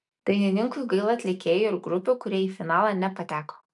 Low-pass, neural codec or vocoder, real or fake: 10.8 kHz; none; real